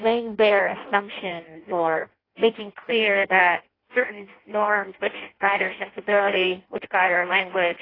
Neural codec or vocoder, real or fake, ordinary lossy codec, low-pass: codec, 16 kHz in and 24 kHz out, 0.6 kbps, FireRedTTS-2 codec; fake; AAC, 24 kbps; 5.4 kHz